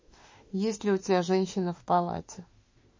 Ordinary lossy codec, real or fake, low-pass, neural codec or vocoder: MP3, 32 kbps; fake; 7.2 kHz; autoencoder, 48 kHz, 32 numbers a frame, DAC-VAE, trained on Japanese speech